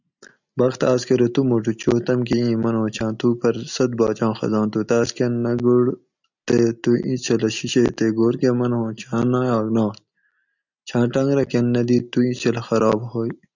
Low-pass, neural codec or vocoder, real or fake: 7.2 kHz; none; real